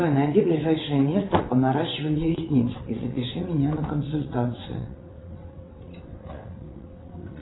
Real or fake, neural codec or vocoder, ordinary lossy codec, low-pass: fake; vocoder, 22.05 kHz, 80 mel bands, WaveNeXt; AAC, 16 kbps; 7.2 kHz